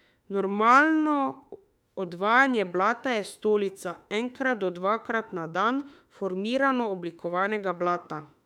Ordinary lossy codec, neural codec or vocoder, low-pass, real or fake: none; autoencoder, 48 kHz, 32 numbers a frame, DAC-VAE, trained on Japanese speech; 19.8 kHz; fake